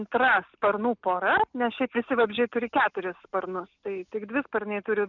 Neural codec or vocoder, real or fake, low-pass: none; real; 7.2 kHz